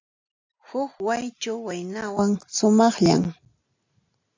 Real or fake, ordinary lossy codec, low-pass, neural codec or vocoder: real; AAC, 48 kbps; 7.2 kHz; none